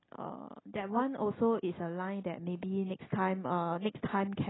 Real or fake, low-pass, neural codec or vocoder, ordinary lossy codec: real; 7.2 kHz; none; AAC, 16 kbps